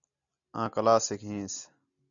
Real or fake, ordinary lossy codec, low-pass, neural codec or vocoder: real; MP3, 96 kbps; 7.2 kHz; none